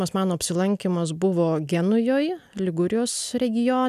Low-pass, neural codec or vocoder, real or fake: 14.4 kHz; none; real